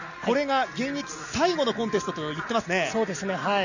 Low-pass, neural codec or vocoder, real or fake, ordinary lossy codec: 7.2 kHz; none; real; none